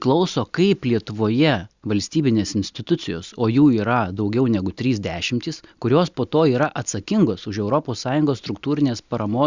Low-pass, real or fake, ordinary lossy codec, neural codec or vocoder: 7.2 kHz; real; Opus, 64 kbps; none